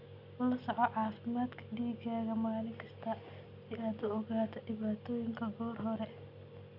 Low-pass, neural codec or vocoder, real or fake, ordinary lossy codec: 5.4 kHz; none; real; none